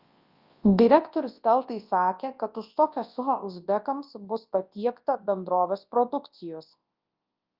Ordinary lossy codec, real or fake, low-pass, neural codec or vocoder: Opus, 24 kbps; fake; 5.4 kHz; codec, 24 kHz, 0.9 kbps, WavTokenizer, large speech release